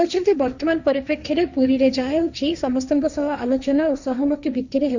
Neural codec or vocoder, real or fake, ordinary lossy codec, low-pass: codec, 16 kHz, 1.1 kbps, Voila-Tokenizer; fake; none; 7.2 kHz